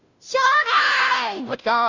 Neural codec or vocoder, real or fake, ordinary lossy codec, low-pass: codec, 16 kHz, 0.5 kbps, FunCodec, trained on Chinese and English, 25 frames a second; fake; Opus, 64 kbps; 7.2 kHz